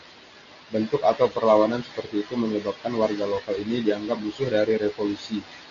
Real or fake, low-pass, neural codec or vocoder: real; 7.2 kHz; none